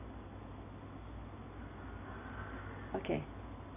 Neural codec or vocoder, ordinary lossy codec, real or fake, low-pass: none; none; real; 3.6 kHz